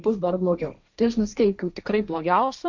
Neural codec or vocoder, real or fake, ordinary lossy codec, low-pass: codec, 16 kHz, 1.1 kbps, Voila-Tokenizer; fake; Opus, 64 kbps; 7.2 kHz